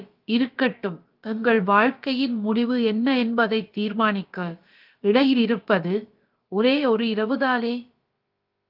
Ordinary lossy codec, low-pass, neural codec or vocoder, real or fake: Opus, 32 kbps; 5.4 kHz; codec, 16 kHz, about 1 kbps, DyCAST, with the encoder's durations; fake